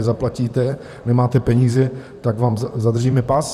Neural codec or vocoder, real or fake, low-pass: vocoder, 44.1 kHz, 128 mel bands, Pupu-Vocoder; fake; 14.4 kHz